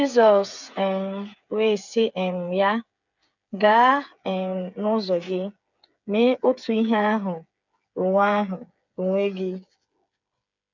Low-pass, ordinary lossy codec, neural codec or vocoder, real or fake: 7.2 kHz; none; codec, 16 kHz, 8 kbps, FreqCodec, smaller model; fake